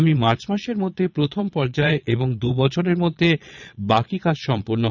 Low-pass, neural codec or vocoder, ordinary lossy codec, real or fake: 7.2 kHz; vocoder, 22.05 kHz, 80 mel bands, Vocos; none; fake